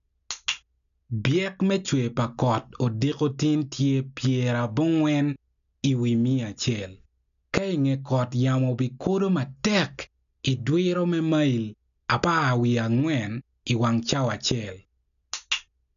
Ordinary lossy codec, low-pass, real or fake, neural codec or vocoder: none; 7.2 kHz; real; none